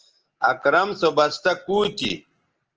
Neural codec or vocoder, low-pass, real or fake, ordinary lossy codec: none; 7.2 kHz; real; Opus, 16 kbps